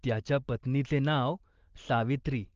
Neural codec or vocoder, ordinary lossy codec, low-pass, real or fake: none; Opus, 16 kbps; 7.2 kHz; real